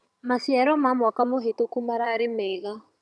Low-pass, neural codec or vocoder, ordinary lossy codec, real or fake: none; vocoder, 22.05 kHz, 80 mel bands, HiFi-GAN; none; fake